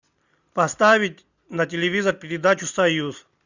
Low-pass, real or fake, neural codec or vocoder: 7.2 kHz; real; none